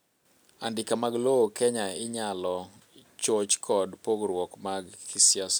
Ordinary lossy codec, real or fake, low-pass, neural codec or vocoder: none; real; none; none